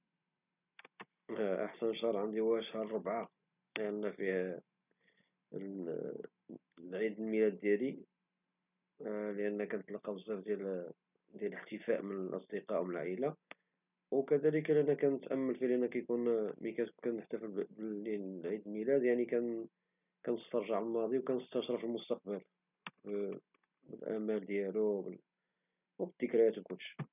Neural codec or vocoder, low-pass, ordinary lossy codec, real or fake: none; 3.6 kHz; none; real